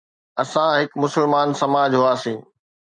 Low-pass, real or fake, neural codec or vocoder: 9.9 kHz; real; none